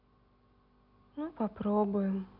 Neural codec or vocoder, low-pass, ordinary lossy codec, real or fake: none; 5.4 kHz; none; real